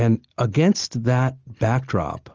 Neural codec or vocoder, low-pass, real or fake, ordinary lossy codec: none; 7.2 kHz; real; Opus, 24 kbps